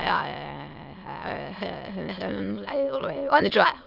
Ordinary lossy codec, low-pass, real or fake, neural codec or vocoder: none; 5.4 kHz; fake; autoencoder, 22.05 kHz, a latent of 192 numbers a frame, VITS, trained on many speakers